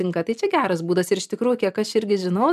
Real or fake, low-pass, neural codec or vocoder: real; 14.4 kHz; none